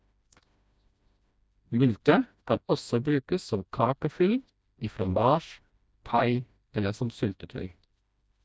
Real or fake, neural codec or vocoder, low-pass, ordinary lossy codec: fake; codec, 16 kHz, 1 kbps, FreqCodec, smaller model; none; none